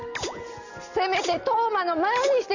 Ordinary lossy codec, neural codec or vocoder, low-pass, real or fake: none; none; 7.2 kHz; real